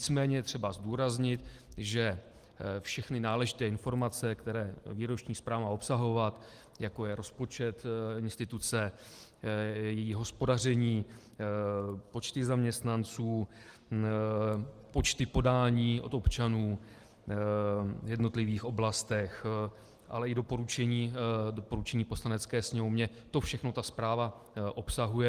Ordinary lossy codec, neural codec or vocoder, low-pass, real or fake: Opus, 24 kbps; none; 14.4 kHz; real